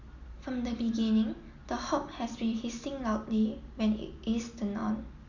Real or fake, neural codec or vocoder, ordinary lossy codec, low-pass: real; none; none; 7.2 kHz